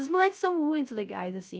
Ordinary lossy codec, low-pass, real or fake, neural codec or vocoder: none; none; fake; codec, 16 kHz, 0.3 kbps, FocalCodec